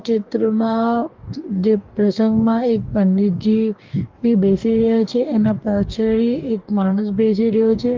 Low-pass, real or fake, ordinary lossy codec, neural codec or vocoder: 7.2 kHz; fake; Opus, 32 kbps; codec, 44.1 kHz, 2.6 kbps, DAC